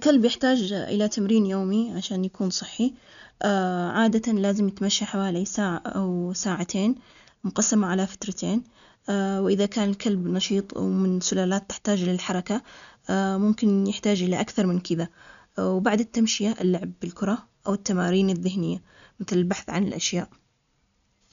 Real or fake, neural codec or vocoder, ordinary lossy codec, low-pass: real; none; none; 7.2 kHz